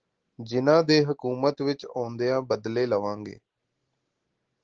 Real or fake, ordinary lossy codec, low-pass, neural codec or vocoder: real; Opus, 16 kbps; 7.2 kHz; none